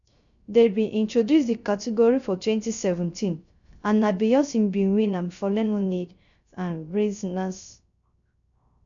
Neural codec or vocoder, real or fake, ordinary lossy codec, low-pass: codec, 16 kHz, 0.3 kbps, FocalCodec; fake; none; 7.2 kHz